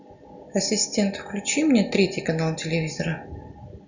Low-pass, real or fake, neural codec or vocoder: 7.2 kHz; real; none